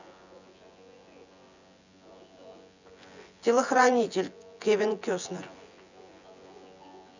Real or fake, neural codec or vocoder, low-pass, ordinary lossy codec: fake; vocoder, 24 kHz, 100 mel bands, Vocos; 7.2 kHz; none